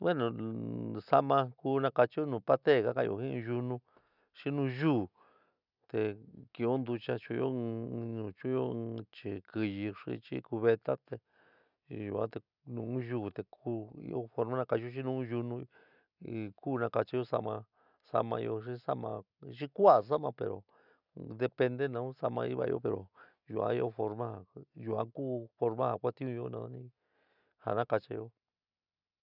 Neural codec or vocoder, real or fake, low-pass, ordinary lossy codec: none; real; 5.4 kHz; none